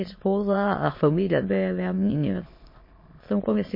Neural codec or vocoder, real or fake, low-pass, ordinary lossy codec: autoencoder, 22.05 kHz, a latent of 192 numbers a frame, VITS, trained on many speakers; fake; 5.4 kHz; MP3, 24 kbps